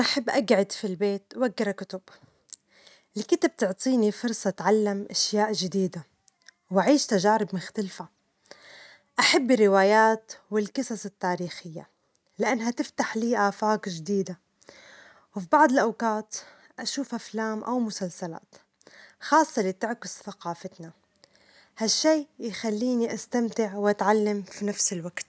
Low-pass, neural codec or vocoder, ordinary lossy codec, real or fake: none; none; none; real